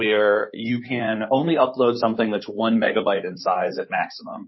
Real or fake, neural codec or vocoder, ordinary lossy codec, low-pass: fake; codec, 16 kHz in and 24 kHz out, 2.2 kbps, FireRedTTS-2 codec; MP3, 24 kbps; 7.2 kHz